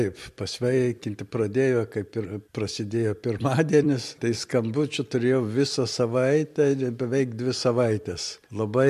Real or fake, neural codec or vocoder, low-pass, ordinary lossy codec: fake; vocoder, 44.1 kHz, 128 mel bands every 256 samples, BigVGAN v2; 14.4 kHz; MP3, 64 kbps